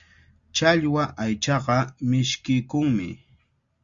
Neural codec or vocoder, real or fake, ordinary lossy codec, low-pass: none; real; Opus, 64 kbps; 7.2 kHz